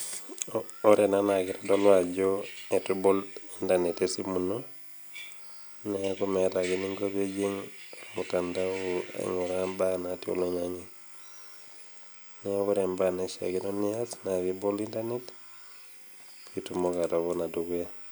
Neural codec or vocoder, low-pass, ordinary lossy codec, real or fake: none; none; none; real